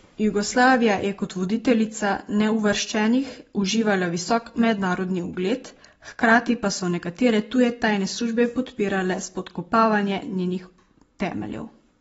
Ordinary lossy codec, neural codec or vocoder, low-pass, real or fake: AAC, 24 kbps; none; 10.8 kHz; real